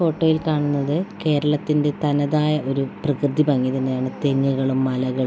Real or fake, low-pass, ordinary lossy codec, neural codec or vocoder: real; none; none; none